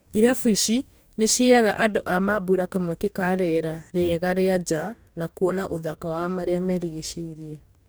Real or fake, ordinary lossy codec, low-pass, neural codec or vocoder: fake; none; none; codec, 44.1 kHz, 2.6 kbps, DAC